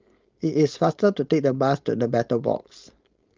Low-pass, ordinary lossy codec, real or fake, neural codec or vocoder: 7.2 kHz; Opus, 24 kbps; fake; codec, 16 kHz, 4.8 kbps, FACodec